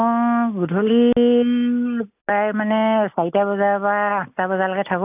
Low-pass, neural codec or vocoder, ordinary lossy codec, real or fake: 3.6 kHz; none; AAC, 32 kbps; real